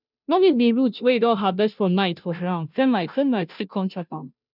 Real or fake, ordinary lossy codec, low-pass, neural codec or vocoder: fake; none; 5.4 kHz; codec, 16 kHz, 0.5 kbps, FunCodec, trained on Chinese and English, 25 frames a second